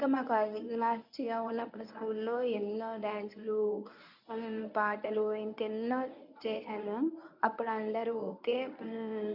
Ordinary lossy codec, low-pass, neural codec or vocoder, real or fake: none; 5.4 kHz; codec, 24 kHz, 0.9 kbps, WavTokenizer, medium speech release version 1; fake